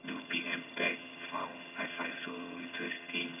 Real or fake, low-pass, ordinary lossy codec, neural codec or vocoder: fake; 3.6 kHz; none; vocoder, 22.05 kHz, 80 mel bands, HiFi-GAN